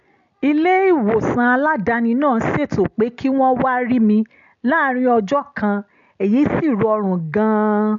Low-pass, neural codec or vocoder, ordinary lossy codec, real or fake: 7.2 kHz; none; AAC, 64 kbps; real